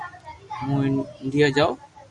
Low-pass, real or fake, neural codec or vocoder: 10.8 kHz; real; none